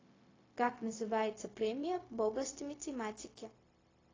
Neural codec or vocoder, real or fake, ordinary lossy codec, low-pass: codec, 16 kHz, 0.4 kbps, LongCat-Audio-Codec; fake; AAC, 32 kbps; 7.2 kHz